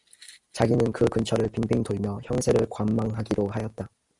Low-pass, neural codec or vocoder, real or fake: 10.8 kHz; none; real